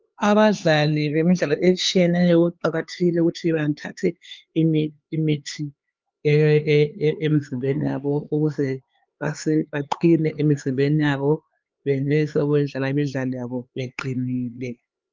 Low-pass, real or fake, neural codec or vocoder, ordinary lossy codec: 7.2 kHz; fake; codec, 16 kHz, 4 kbps, X-Codec, HuBERT features, trained on LibriSpeech; Opus, 24 kbps